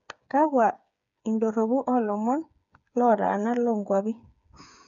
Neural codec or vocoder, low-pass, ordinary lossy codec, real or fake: codec, 16 kHz, 8 kbps, FreqCodec, smaller model; 7.2 kHz; none; fake